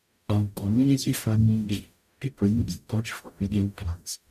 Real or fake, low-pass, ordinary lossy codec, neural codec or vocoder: fake; 14.4 kHz; none; codec, 44.1 kHz, 0.9 kbps, DAC